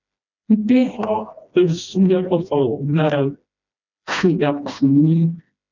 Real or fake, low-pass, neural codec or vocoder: fake; 7.2 kHz; codec, 16 kHz, 1 kbps, FreqCodec, smaller model